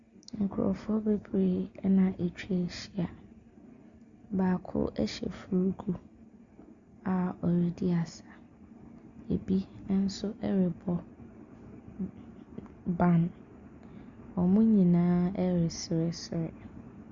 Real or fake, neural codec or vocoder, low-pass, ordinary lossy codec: real; none; 7.2 kHz; Opus, 64 kbps